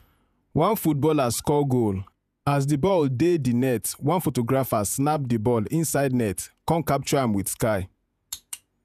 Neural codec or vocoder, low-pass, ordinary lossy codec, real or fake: vocoder, 48 kHz, 128 mel bands, Vocos; 14.4 kHz; none; fake